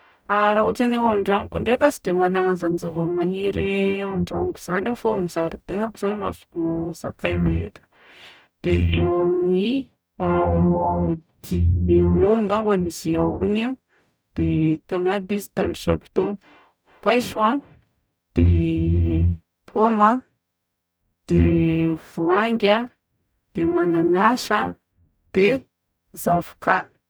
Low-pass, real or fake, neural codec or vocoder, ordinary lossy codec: none; fake; codec, 44.1 kHz, 0.9 kbps, DAC; none